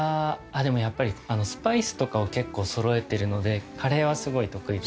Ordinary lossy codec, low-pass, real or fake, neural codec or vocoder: none; none; real; none